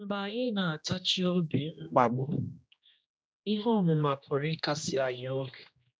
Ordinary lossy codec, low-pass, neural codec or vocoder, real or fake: none; none; codec, 16 kHz, 1 kbps, X-Codec, HuBERT features, trained on general audio; fake